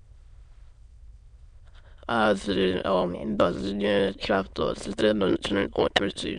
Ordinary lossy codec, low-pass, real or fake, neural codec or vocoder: MP3, 64 kbps; 9.9 kHz; fake; autoencoder, 22.05 kHz, a latent of 192 numbers a frame, VITS, trained on many speakers